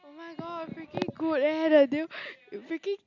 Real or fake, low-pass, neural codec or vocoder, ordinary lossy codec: real; 7.2 kHz; none; none